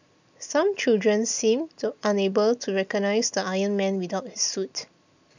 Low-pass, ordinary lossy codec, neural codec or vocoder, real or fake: 7.2 kHz; none; none; real